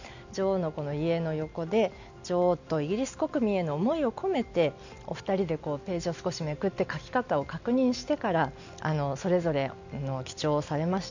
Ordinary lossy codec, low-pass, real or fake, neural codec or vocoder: none; 7.2 kHz; real; none